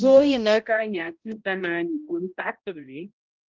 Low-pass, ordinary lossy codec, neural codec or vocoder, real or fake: 7.2 kHz; Opus, 16 kbps; codec, 16 kHz, 0.5 kbps, X-Codec, HuBERT features, trained on balanced general audio; fake